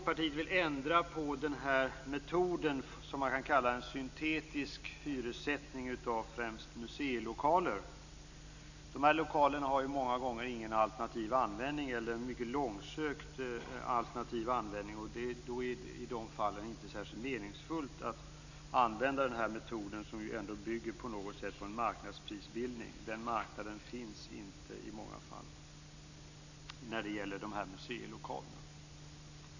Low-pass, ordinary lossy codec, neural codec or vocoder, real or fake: 7.2 kHz; none; none; real